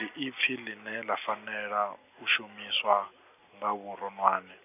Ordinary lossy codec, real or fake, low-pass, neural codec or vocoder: none; real; 3.6 kHz; none